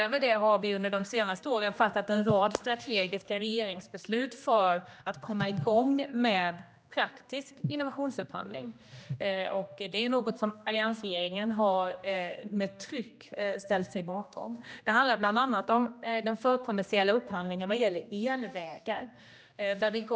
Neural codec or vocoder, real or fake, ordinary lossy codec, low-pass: codec, 16 kHz, 1 kbps, X-Codec, HuBERT features, trained on general audio; fake; none; none